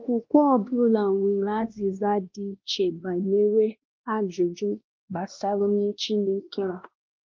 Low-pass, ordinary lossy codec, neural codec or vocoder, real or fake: 7.2 kHz; Opus, 32 kbps; codec, 16 kHz, 1 kbps, X-Codec, WavLM features, trained on Multilingual LibriSpeech; fake